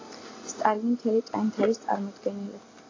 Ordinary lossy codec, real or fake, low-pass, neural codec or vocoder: AAC, 32 kbps; real; 7.2 kHz; none